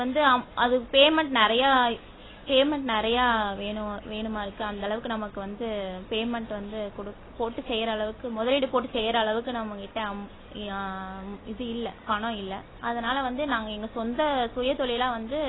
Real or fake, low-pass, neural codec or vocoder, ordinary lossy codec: real; 7.2 kHz; none; AAC, 16 kbps